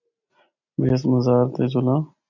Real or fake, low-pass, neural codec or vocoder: real; 7.2 kHz; none